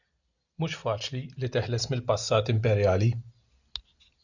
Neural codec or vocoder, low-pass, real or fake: none; 7.2 kHz; real